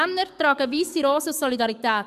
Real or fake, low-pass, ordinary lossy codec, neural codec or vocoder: fake; 14.4 kHz; none; codec, 44.1 kHz, 7.8 kbps, DAC